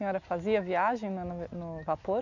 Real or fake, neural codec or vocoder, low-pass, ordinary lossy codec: real; none; 7.2 kHz; none